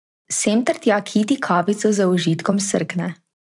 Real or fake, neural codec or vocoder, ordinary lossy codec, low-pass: fake; vocoder, 44.1 kHz, 128 mel bands every 512 samples, BigVGAN v2; none; 10.8 kHz